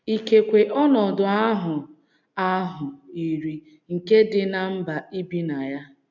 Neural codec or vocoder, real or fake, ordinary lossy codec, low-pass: none; real; none; 7.2 kHz